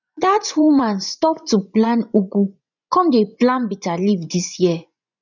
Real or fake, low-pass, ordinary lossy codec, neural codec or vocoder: fake; 7.2 kHz; none; vocoder, 24 kHz, 100 mel bands, Vocos